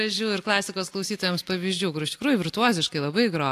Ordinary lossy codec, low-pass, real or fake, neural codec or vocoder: AAC, 64 kbps; 14.4 kHz; real; none